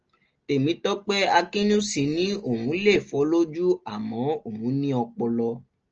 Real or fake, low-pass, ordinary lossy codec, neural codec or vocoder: real; 7.2 kHz; Opus, 24 kbps; none